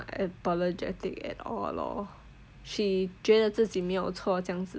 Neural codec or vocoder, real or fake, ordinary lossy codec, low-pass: none; real; none; none